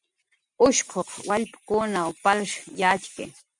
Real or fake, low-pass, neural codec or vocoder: real; 10.8 kHz; none